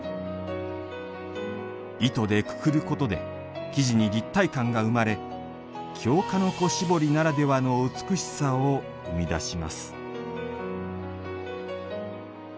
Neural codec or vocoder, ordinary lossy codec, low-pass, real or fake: none; none; none; real